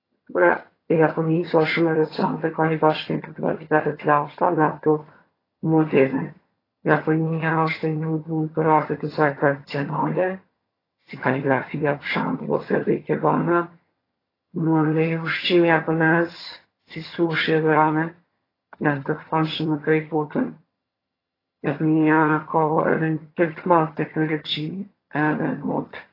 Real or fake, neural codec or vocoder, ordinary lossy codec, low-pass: fake; vocoder, 22.05 kHz, 80 mel bands, HiFi-GAN; AAC, 24 kbps; 5.4 kHz